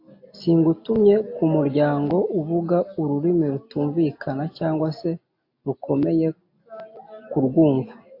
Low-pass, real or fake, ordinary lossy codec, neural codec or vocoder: 5.4 kHz; real; AAC, 32 kbps; none